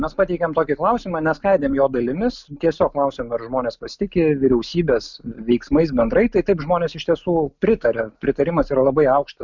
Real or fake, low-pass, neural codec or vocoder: real; 7.2 kHz; none